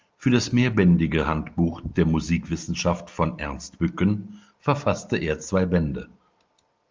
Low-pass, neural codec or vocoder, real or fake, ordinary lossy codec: 7.2 kHz; none; real; Opus, 32 kbps